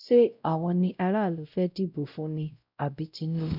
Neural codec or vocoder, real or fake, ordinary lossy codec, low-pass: codec, 16 kHz, 0.5 kbps, X-Codec, WavLM features, trained on Multilingual LibriSpeech; fake; none; 5.4 kHz